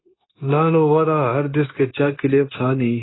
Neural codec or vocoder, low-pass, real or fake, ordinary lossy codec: codec, 16 kHz, 0.9 kbps, LongCat-Audio-Codec; 7.2 kHz; fake; AAC, 16 kbps